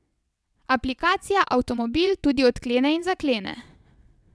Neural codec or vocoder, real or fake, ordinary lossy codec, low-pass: vocoder, 22.05 kHz, 80 mel bands, WaveNeXt; fake; none; none